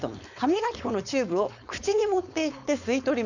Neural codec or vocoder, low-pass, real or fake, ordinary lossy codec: codec, 16 kHz, 4.8 kbps, FACodec; 7.2 kHz; fake; none